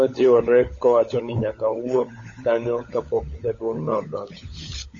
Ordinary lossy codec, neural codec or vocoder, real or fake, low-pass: MP3, 32 kbps; codec, 16 kHz, 16 kbps, FunCodec, trained on LibriTTS, 50 frames a second; fake; 7.2 kHz